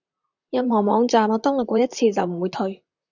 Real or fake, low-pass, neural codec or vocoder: fake; 7.2 kHz; vocoder, 44.1 kHz, 128 mel bands, Pupu-Vocoder